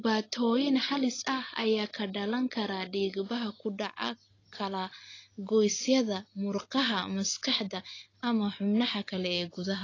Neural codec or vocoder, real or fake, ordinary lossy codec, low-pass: none; real; AAC, 32 kbps; 7.2 kHz